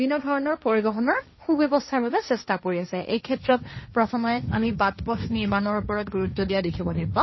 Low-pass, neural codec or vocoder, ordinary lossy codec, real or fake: 7.2 kHz; codec, 16 kHz, 1.1 kbps, Voila-Tokenizer; MP3, 24 kbps; fake